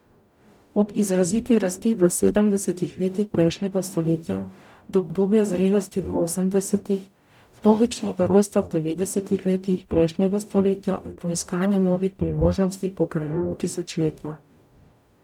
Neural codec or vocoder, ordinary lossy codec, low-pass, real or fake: codec, 44.1 kHz, 0.9 kbps, DAC; none; 19.8 kHz; fake